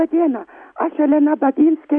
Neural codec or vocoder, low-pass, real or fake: none; 9.9 kHz; real